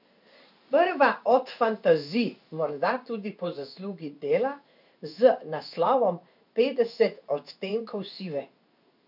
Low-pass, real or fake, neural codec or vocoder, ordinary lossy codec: 5.4 kHz; fake; codec, 16 kHz in and 24 kHz out, 1 kbps, XY-Tokenizer; none